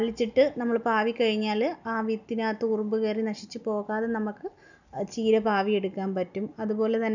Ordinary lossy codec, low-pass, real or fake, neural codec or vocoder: none; 7.2 kHz; real; none